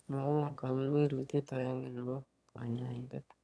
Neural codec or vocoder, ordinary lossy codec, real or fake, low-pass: autoencoder, 22.05 kHz, a latent of 192 numbers a frame, VITS, trained on one speaker; none; fake; none